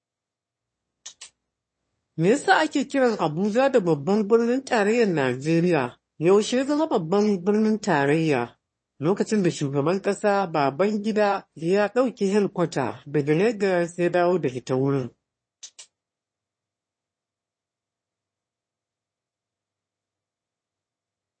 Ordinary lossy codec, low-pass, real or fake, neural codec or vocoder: MP3, 32 kbps; 9.9 kHz; fake; autoencoder, 22.05 kHz, a latent of 192 numbers a frame, VITS, trained on one speaker